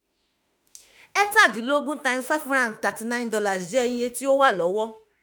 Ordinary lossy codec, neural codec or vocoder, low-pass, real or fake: none; autoencoder, 48 kHz, 32 numbers a frame, DAC-VAE, trained on Japanese speech; none; fake